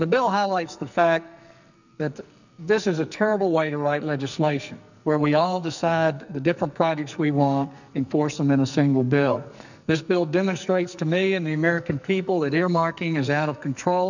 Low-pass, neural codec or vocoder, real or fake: 7.2 kHz; codec, 44.1 kHz, 2.6 kbps, SNAC; fake